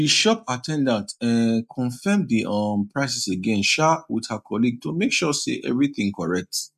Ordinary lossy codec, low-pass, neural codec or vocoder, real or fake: none; 14.4 kHz; none; real